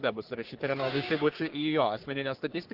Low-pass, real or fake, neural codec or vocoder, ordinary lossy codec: 5.4 kHz; fake; codec, 44.1 kHz, 3.4 kbps, Pupu-Codec; Opus, 16 kbps